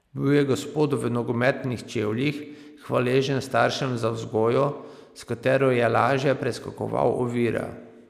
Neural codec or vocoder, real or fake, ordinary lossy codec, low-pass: none; real; none; 14.4 kHz